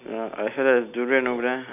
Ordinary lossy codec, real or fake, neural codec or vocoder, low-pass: none; real; none; 3.6 kHz